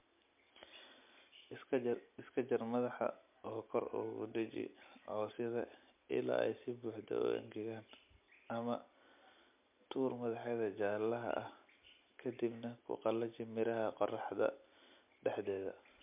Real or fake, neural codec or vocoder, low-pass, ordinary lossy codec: real; none; 3.6 kHz; MP3, 32 kbps